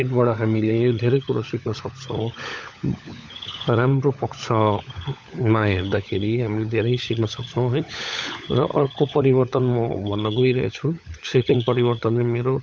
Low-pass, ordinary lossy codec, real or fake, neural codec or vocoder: none; none; fake; codec, 16 kHz, 16 kbps, FunCodec, trained on LibriTTS, 50 frames a second